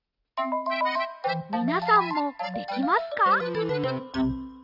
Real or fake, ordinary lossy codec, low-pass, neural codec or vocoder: real; none; 5.4 kHz; none